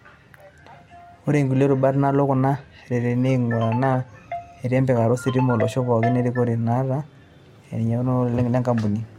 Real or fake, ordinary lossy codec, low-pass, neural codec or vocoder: real; MP3, 64 kbps; 19.8 kHz; none